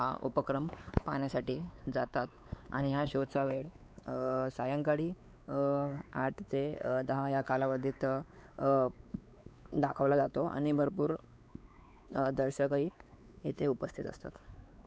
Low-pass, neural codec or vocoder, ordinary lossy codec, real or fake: none; codec, 16 kHz, 4 kbps, X-Codec, WavLM features, trained on Multilingual LibriSpeech; none; fake